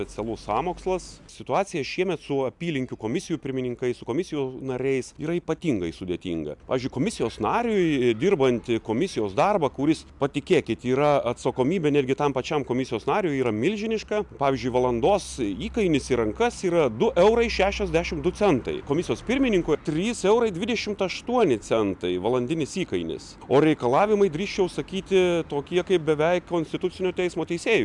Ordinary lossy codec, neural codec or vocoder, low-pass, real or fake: MP3, 96 kbps; none; 10.8 kHz; real